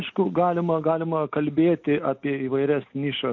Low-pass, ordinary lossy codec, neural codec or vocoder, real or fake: 7.2 kHz; AAC, 48 kbps; none; real